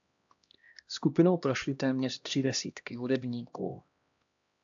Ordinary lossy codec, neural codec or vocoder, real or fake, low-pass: AAC, 64 kbps; codec, 16 kHz, 1 kbps, X-Codec, HuBERT features, trained on LibriSpeech; fake; 7.2 kHz